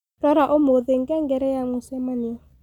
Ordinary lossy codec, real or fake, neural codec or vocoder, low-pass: none; real; none; 19.8 kHz